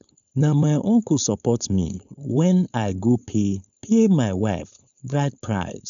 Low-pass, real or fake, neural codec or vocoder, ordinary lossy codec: 7.2 kHz; fake; codec, 16 kHz, 4.8 kbps, FACodec; none